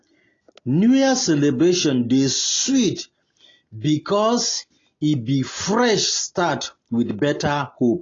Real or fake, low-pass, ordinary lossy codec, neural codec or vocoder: real; 7.2 kHz; AAC, 32 kbps; none